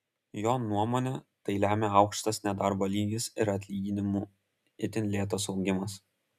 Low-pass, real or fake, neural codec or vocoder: 14.4 kHz; real; none